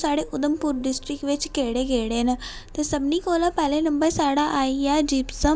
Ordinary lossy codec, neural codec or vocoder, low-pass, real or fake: none; none; none; real